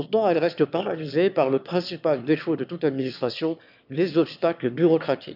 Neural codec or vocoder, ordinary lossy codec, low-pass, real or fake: autoencoder, 22.05 kHz, a latent of 192 numbers a frame, VITS, trained on one speaker; none; 5.4 kHz; fake